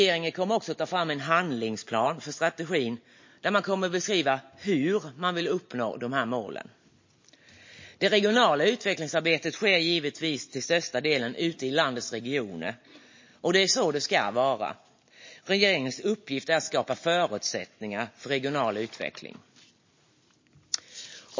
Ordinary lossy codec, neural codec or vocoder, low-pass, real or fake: MP3, 32 kbps; none; 7.2 kHz; real